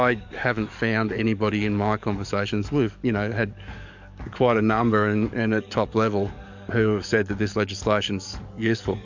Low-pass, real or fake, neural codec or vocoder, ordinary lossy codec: 7.2 kHz; fake; codec, 44.1 kHz, 7.8 kbps, DAC; MP3, 64 kbps